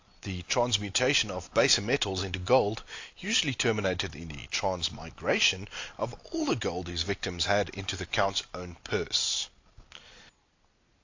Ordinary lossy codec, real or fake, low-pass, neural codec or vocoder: AAC, 48 kbps; real; 7.2 kHz; none